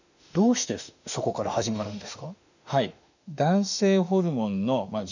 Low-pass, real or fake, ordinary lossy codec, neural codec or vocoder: 7.2 kHz; fake; none; autoencoder, 48 kHz, 32 numbers a frame, DAC-VAE, trained on Japanese speech